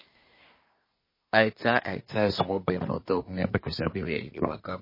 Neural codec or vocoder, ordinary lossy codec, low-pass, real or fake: codec, 24 kHz, 1 kbps, SNAC; MP3, 24 kbps; 5.4 kHz; fake